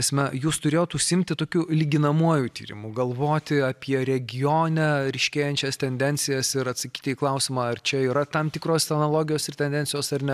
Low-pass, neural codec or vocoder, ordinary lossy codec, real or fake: 14.4 kHz; none; AAC, 96 kbps; real